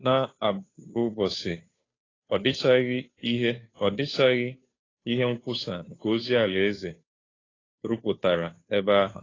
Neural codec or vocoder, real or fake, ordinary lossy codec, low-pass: codec, 16 kHz, 2 kbps, FunCodec, trained on Chinese and English, 25 frames a second; fake; AAC, 32 kbps; 7.2 kHz